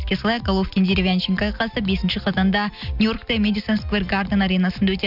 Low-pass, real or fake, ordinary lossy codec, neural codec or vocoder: 5.4 kHz; real; none; none